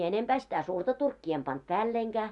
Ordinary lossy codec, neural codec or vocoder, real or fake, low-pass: none; none; real; 10.8 kHz